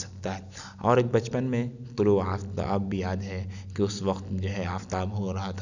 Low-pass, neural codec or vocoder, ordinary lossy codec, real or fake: 7.2 kHz; none; none; real